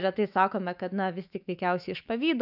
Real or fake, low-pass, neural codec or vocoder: fake; 5.4 kHz; autoencoder, 48 kHz, 128 numbers a frame, DAC-VAE, trained on Japanese speech